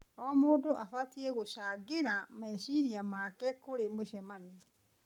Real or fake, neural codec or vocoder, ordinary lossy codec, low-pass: fake; codec, 44.1 kHz, 7.8 kbps, Pupu-Codec; none; 19.8 kHz